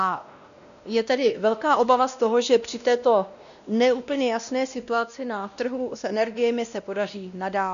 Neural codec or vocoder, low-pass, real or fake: codec, 16 kHz, 1 kbps, X-Codec, WavLM features, trained on Multilingual LibriSpeech; 7.2 kHz; fake